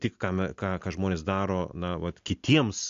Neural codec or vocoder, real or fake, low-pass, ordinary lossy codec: none; real; 7.2 kHz; AAC, 64 kbps